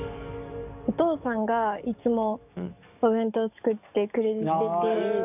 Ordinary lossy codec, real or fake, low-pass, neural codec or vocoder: none; fake; 3.6 kHz; codec, 44.1 kHz, 7.8 kbps, DAC